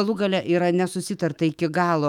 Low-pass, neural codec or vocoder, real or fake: 19.8 kHz; autoencoder, 48 kHz, 128 numbers a frame, DAC-VAE, trained on Japanese speech; fake